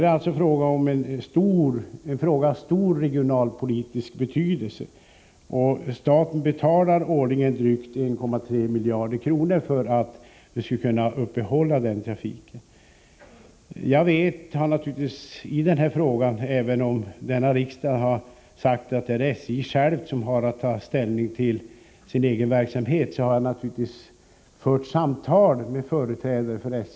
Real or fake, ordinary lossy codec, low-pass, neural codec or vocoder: real; none; none; none